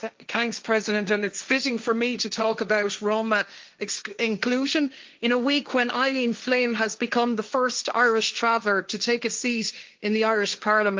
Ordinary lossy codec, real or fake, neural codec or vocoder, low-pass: Opus, 24 kbps; fake; codec, 16 kHz, 1.1 kbps, Voila-Tokenizer; 7.2 kHz